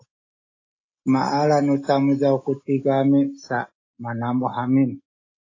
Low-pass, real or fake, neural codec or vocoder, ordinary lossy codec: 7.2 kHz; real; none; AAC, 32 kbps